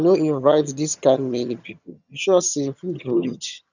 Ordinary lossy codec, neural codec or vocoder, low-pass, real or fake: none; vocoder, 22.05 kHz, 80 mel bands, HiFi-GAN; 7.2 kHz; fake